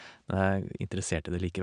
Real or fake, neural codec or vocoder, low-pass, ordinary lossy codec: real; none; 9.9 kHz; none